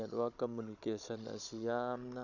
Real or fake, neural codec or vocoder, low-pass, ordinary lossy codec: fake; codec, 16 kHz, 16 kbps, FunCodec, trained on Chinese and English, 50 frames a second; 7.2 kHz; none